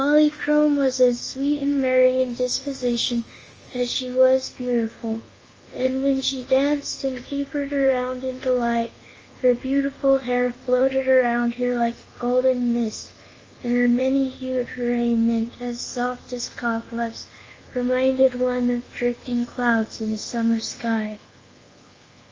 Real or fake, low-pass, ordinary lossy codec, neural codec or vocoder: fake; 7.2 kHz; Opus, 24 kbps; codec, 24 kHz, 1.2 kbps, DualCodec